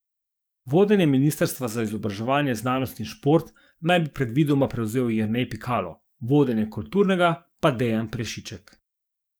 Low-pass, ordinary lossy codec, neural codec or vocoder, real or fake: none; none; codec, 44.1 kHz, 7.8 kbps, DAC; fake